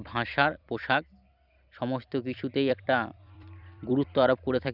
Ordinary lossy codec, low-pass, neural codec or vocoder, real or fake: none; 5.4 kHz; vocoder, 44.1 kHz, 128 mel bands every 256 samples, BigVGAN v2; fake